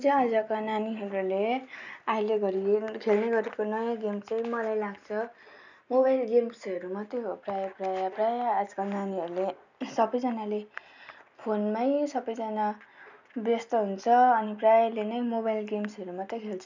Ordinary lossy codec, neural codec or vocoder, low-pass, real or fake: none; none; 7.2 kHz; real